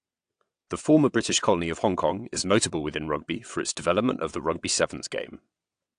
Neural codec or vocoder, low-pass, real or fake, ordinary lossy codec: vocoder, 22.05 kHz, 80 mel bands, WaveNeXt; 9.9 kHz; fake; AAC, 64 kbps